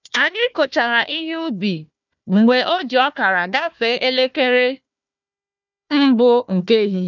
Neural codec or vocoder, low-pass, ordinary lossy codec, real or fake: codec, 16 kHz, 1 kbps, FunCodec, trained on Chinese and English, 50 frames a second; 7.2 kHz; none; fake